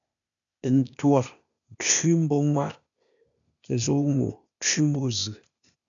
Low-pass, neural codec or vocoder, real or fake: 7.2 kHz; codec, 16 kHz, 0.8 kbps, ZipCodec; fake